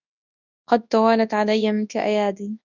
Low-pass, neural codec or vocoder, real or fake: 7.2 kHz; codec, 24 kHz, 0.9 kbps, WavTokenizer, large speech release; fake